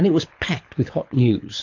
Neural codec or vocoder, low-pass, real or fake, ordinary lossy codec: codec, 24 kHz, 6 kbps, HILCodec; 7.2 kHz; fake; AAC, 48 kbps